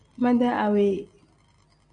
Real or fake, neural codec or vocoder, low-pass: real; none; 9.9 kHz